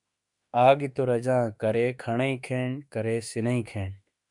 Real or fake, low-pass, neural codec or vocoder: fake; 10.8 kHz; autoencoder, 48 kHz, 32 numbers a frame, DAC-VAE, trained on Japanese speech